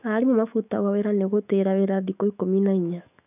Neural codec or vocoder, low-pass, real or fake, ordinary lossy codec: autoencoder, 48 kHz, 128 numbers a frame, DAC-VAE, trained on Japanese speech; 3.6 kHz; fake; none